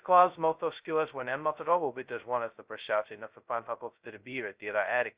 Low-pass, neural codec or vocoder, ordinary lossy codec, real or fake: 3.6 kHz; codec, 16 kHz, 0.2 kbps, FocalCodec; Opus, 64 kbps; fake